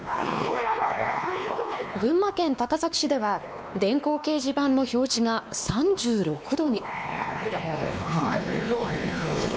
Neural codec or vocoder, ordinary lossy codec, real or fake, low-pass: codec, 16 kHz, 2 kbps, X-Codec, WavLM features, trained on Multilingual LibriSpeech; none; fake; none